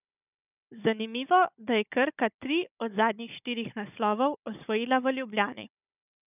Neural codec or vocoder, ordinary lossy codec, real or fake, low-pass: codec, 16 kHz, 16 kbps, FunCodec, trained on Chinese and English, 50 frames a second; none; fake; 3.6 kHz